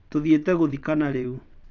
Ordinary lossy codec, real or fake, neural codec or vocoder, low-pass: none; fake; vocoder, 22.05 kHz, 80 mel bands, WaveNeXt; 7.2 kHz